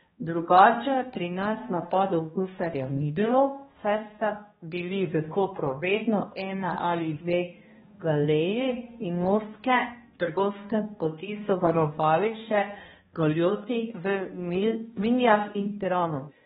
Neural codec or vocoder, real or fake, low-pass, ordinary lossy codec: codec, 16 kHz, 1 kbps, X-Codec, HuBERT features, trained on balanced general audio; fake; 7.2 kHz; AAC, 16 kbps